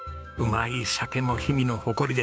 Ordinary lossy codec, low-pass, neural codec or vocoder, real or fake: none; none; codec, 16 kHz, 6 kbps, DAC; fake